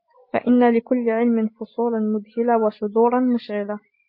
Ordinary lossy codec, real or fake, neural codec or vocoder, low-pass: MP3, 32 kbps; real; none; 5.4 kHz